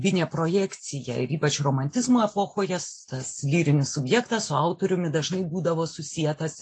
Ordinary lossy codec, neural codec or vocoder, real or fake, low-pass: AAC, 48 kbps; none; real; 10.8 kHz